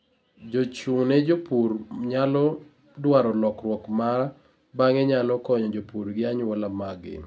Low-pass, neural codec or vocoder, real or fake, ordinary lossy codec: none; none; real; none